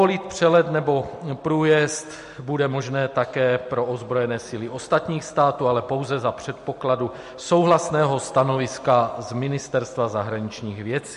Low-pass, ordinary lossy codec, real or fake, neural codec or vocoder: 14.4 kHz; MP3, 48 kbps; real; none